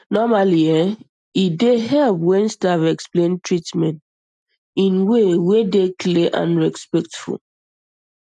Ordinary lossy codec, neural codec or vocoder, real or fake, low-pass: none; none; real; 10.8 kHz